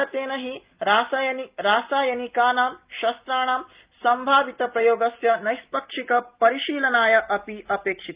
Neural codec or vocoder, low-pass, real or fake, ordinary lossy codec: none; 3.6 kHz; real; Opus, 32 kbps